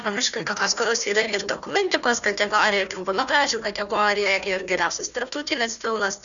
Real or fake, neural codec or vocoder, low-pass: fake; codec, 16 kHz, 1 kbps, FunCodec, trained on Chinese and English, 50 frames a second; 7.2 kHz